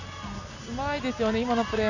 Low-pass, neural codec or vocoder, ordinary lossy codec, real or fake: 7.2 kHz; none; none; real